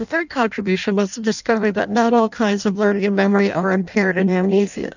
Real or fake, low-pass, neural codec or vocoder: fake; 7.2 kHz; codec, 16 kHz in and 24 kHz out, 0.6 kbps, FireRedTTS-2 codec